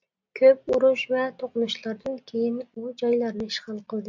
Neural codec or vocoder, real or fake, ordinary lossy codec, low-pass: none; real; MP3, 64 kbps; 7.2 kHz